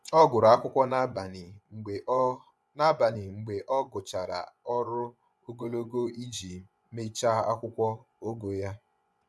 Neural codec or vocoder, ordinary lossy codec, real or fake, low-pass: vocoder, 24 kHz, 100 mel bands, Vocos; none; fake; none